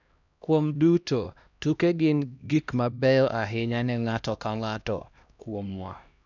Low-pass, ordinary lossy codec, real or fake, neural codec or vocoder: 7.2 kHz; none; fake; codec, 16 kHz, 1 kbps, X-Codec, HuBERT features, trained on LibriSpeech